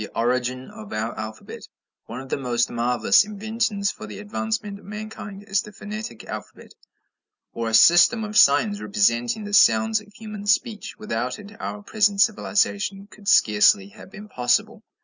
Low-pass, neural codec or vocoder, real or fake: 7.2 kHz; none; real